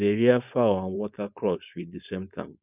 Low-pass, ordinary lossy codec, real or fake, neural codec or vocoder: 3.6 kHz; none; fake; codec, 16 kHz, 4.8 kbps, FACodec